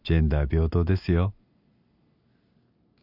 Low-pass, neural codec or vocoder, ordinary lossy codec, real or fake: 5.4 kHz; none; none; real